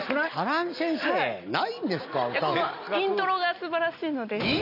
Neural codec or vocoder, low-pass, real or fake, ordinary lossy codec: none; 5.4 kHz; real; none